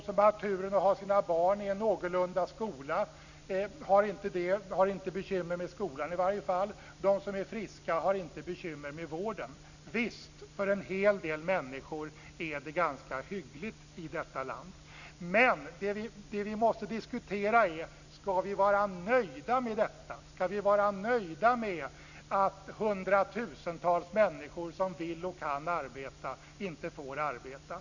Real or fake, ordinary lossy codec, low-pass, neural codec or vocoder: real; none; 7.2 kHz; none